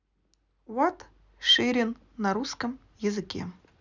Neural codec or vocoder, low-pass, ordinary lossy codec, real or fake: none; 7.2 kHz; none; real